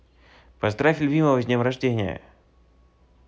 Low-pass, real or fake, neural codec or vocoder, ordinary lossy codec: none; real; none; none